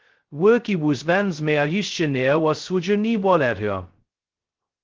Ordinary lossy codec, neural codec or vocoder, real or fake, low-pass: Opus, 16 kbps; codec, 16 kHz, 0.2 kbps, FocalCodec; fake; 7.2 kHz